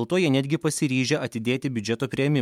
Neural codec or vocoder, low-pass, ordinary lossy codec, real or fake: none; 19.8 kHz; MP3, 96 kbps; real